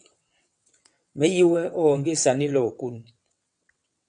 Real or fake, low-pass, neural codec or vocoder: fake; 9.9 kHz; vocoder, 22.05 kHz, 80 mel bands, WaveNeXt